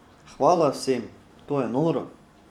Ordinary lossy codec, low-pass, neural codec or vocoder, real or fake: none; 19.8 kHz; vocoder, 44.1 kHz, 128 mel bands every 512 samples, BigVGAN v2; fake